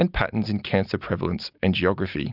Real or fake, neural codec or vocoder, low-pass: real; none; 5.4 kHz